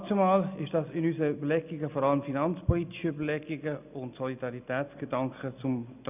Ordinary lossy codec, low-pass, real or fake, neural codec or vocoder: none; 3.6 kHz; real; none